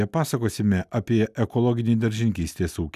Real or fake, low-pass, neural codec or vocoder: real; 14.4 kHz; none